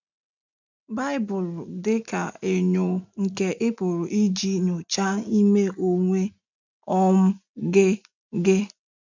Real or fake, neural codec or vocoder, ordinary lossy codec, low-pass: real; none; none; 7.2 kHz